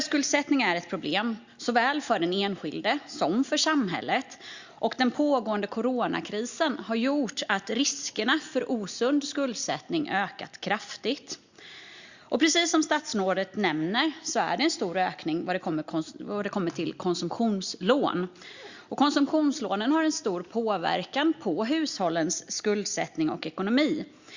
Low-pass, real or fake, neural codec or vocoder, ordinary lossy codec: 7.2 kHz; real; none; Opus, 64 kbps